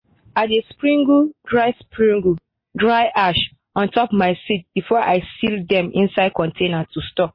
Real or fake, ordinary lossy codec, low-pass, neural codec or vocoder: real; MP3, 24 kbps; 5.4 kHz; none